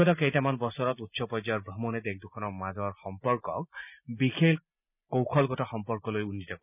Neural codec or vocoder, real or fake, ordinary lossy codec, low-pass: none; real; none; 3.6 kHz